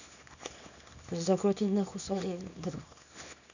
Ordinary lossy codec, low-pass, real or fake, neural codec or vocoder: none; 7.2 kHz; fake; codec, 24 kHz, 0.9 kbps, WavTokenizer, small release